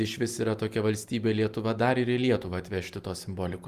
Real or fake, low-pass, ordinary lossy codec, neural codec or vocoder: real; 14.4 kHz; Opus, 32 kbps; none